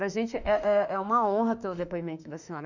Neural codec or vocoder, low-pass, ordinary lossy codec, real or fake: autoencoder, 48 kHz, 32 numbers a frame, DAC-VAE, trained on Japanese speech; 7.2 kHz; none; fake